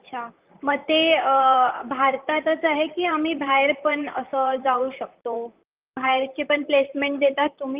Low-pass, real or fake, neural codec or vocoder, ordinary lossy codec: 3.6 kHz; fake; vocoder, 44.1 kHz, 128 mel bands, Pupu-Vocoder; Opus, 24 kbps